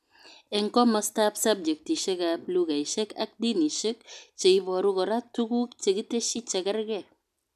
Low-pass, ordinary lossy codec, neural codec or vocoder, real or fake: 14.4 kHz; none; none; real